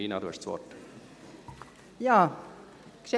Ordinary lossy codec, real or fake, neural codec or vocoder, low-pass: none; real; none; none